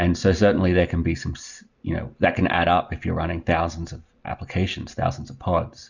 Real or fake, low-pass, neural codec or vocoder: real; 7.2 kHz; none